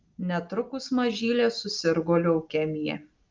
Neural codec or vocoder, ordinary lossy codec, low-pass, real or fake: none; Opus, 24 kbps; 7.2 kHz; real